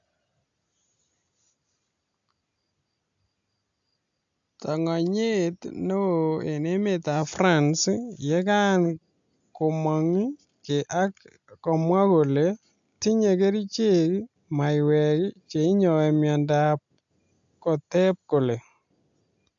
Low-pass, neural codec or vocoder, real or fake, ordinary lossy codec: 7.2 kHz; none; real; AAC, 64 kbps